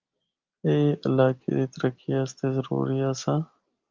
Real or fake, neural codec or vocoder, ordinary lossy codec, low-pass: real; none; Opus, 32 kbps; 7.2 kHz